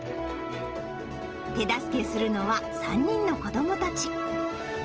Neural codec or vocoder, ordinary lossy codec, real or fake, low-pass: none; Opus, 16 kbps; real; 7.2 kHz